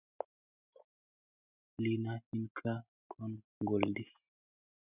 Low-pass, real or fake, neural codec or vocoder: 3.6 kHz; real; none